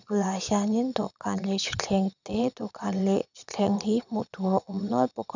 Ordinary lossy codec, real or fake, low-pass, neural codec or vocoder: none; fake; 7.2 kHz; vocoder, 22.05 kHz, 80 mel bands, Vocos